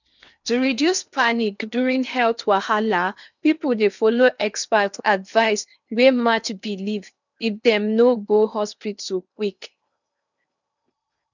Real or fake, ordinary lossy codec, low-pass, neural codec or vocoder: fake; none; 7.2 kHz; codec, 16 kHz in and 24 kHz out, 0.8 kbps, FocalCodec, streaming, 65536 codes